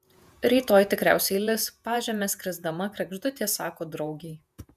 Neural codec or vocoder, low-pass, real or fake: vocoder, 48 kHz, 128 mel bands, Vocos; 14.4 kHz; fake